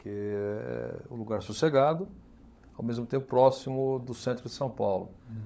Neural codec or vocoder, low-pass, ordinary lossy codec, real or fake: codec, 16 kHz, 16 kbps, FunCodec, trained on LibriTTS, 50 frames a second; none; none; fake